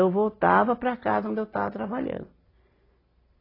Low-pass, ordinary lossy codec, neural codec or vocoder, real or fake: 5.4 kHz; AAC, 24 kbps; none; real